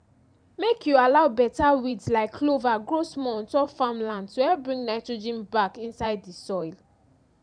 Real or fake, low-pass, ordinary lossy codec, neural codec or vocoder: fake; 9.9 kHz; none; vocoder, 44.1 kHz, 128 mel bands every 512 samples, BigVGAN v2